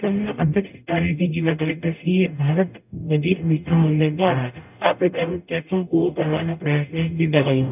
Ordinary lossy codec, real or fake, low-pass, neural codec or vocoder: none; fake; 3.6 kHz; codec, 44.1 kHz, 0.9 kbps, DAC